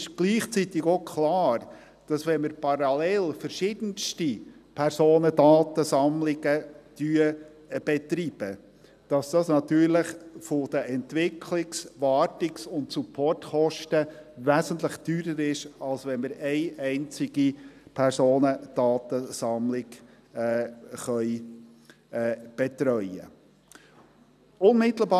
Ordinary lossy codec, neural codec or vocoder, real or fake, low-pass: none; none; real; 14.4 kHz